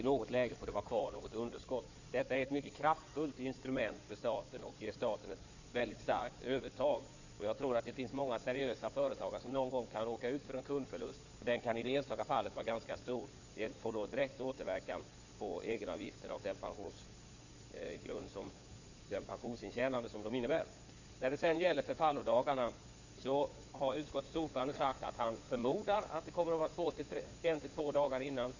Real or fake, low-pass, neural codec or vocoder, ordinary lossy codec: fake; 7.2 kHz; codec, 16 kHz in and 24 kHz out, 2.2 kbps, FireRedTTS-2 codec; none